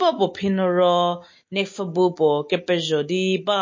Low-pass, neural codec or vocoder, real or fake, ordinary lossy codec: 7.2 kHz; none; real; MP3, 32 kbps